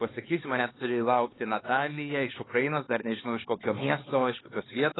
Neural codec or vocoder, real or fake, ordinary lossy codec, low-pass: codec, 16 kHz, 4 kbps, X-Codec, HuBERT features, trained on LibriSpeech; fake; AAC, 16 kbps; 7.2 kHz